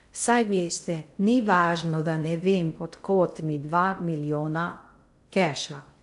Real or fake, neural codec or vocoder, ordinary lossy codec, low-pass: fake; codec, 16 kHz in and 24 kHz out, 0.6 kbps, FocalCodec, streaming, 2048 codes; none; 10.8 kHz